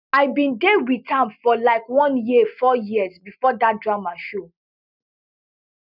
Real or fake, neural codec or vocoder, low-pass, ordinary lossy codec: real; none; 5.4 kHz; none